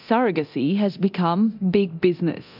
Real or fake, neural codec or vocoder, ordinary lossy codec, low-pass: fake; codec, 24 kHz, 0.9 kbps, DualCodec; AAC, 48 kbps; 5.4 kHz